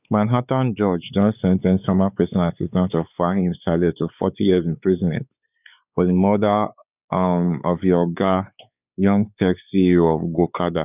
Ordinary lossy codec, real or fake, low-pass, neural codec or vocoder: none; fake; 3.6 kHz; codec, 16 kHz, 4 kbps, X-Codec, WavLM features, trained on Multilingual LibriSpeech